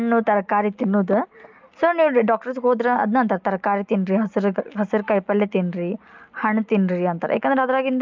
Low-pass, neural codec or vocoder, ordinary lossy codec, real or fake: 7.2 kHz; none; Opus, 24 kbps; real